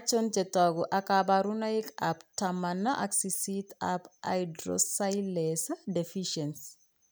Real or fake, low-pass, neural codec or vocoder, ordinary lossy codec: real; none; none; none